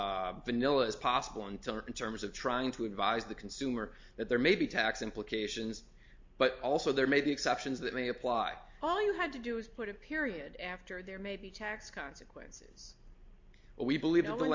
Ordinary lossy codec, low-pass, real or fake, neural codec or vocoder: MP3, 48 kbps; 7.2 kHz; real; none